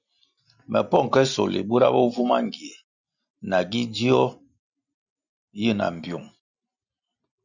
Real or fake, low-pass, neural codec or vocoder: real; 7.2 kHz; none